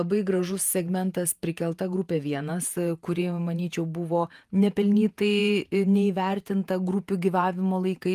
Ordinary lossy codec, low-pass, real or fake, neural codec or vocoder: Opus, 32 kbps; 14.4 kHz; fake; vocoder, 48 kHz, 128 mel bands, Vocos